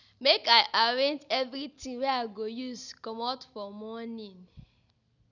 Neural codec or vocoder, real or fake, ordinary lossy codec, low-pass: none; real; none; 7.2 kHz